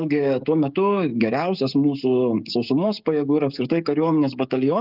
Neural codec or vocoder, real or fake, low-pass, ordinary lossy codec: codec, 16 kHz, 16 kbps, FreqCodec, smaller model; fake; 5.4 kHz; Opus, 32 kbps